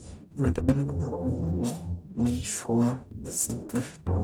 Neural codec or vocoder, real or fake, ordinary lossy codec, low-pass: codec, 44.1 kHz, 0.9 kbps, DAC; fake; none; none